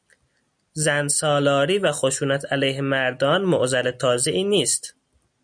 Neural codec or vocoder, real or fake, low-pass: none; real; 9.9 kHz